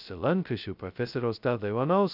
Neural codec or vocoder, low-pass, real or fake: codec, 16 kHz, 0.2 kbps, FocalCodec; 5.4 kHz; fake